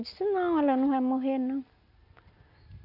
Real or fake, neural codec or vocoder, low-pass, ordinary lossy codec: real; none; 5.4 kHz; none